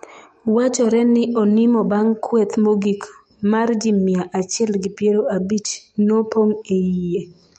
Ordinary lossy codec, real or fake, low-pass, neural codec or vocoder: MP3, 48 kbps; fake; 19.8 kHz; autoencoder, 48 kHz, 128 numbers a frame, DAC-VAE, trained on Japanese speech